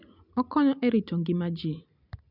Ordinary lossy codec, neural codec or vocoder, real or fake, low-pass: none; none; real; 5.4 kHz